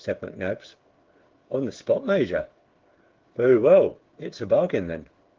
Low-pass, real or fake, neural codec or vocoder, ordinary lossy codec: 7.2 kHz; fake; codec, 16 kHz, 8 kbps, FreqCodec, smaller model; Opus, 16 kbps